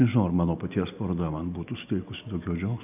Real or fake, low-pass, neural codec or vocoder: real; 3.6 kHz; none